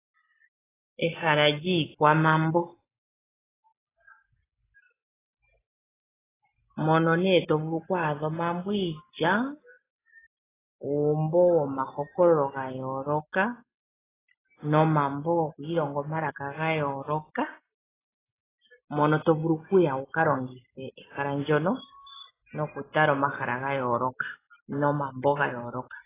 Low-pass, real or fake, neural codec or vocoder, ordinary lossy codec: 3.6 kHz; real; none; AAC, 16 kbps